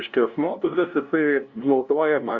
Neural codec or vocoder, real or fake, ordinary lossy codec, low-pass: codec, 16 kHz, 0.5 kbps, FunCodec, trained on LibriTTS, 25 frames a second; fake; Opus, 64 kbps; 7.2 kHz